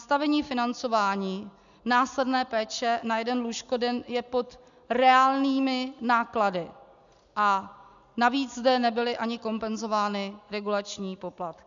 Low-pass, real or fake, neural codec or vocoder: 7.2 kHz; real; none